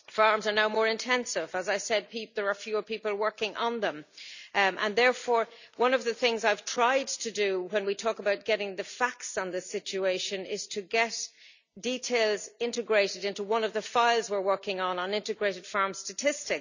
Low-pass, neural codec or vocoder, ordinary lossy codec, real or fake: 7.2 kHz; none; none; real